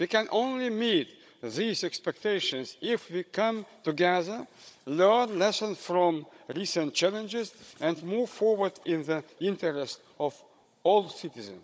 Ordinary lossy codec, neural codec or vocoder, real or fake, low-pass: none; codec, 16 kHz, 16 kbps, FunCodec, trained on Chinese and English, 50 frames a second; fake; none